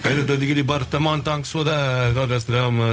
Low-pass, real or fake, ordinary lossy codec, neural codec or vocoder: none; fake; none; codec, 16 kHz, 0.4 kbps, LongCat-Audio-Codec